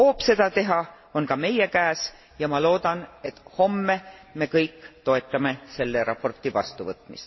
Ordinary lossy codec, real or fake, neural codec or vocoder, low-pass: MP3, 24 kbps; real; none; 7.2 kHz